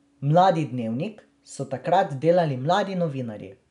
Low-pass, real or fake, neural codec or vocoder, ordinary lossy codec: 10.8 kHz; real; none; none